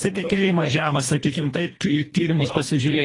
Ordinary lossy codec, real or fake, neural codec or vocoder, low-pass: AAC, 32 kbps; fake; codec, 24 kHz, 1.5 kbps, HILCodec; 10.8 kHz